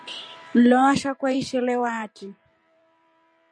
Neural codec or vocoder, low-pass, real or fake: vocoder, 44.1 kHz, 128 mel bands every 512 samples, BigVGAN v2; 9.9 kHz; fake